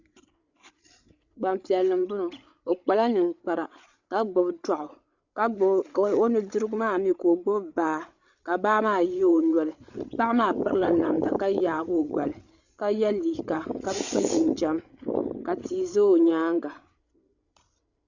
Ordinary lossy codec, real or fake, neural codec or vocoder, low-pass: Opus, 64 kbps; fake; codec, 16 kHz, 8 kbps, FreqCodec, larger model; 7.2 kHz